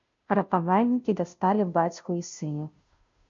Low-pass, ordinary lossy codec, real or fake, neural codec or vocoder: 7.2 kHz; MP3, 48 kbps; fake; codec, 16 kHz, 0.5 kbps, FunCodec, trained on Chinese and English, 25 frames a second